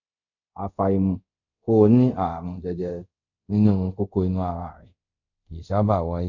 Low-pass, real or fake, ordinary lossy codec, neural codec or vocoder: 7.2 kHz; fake; MP3, 48 kbps; codec, 24 kHz, 0.5 kbps, DualCodec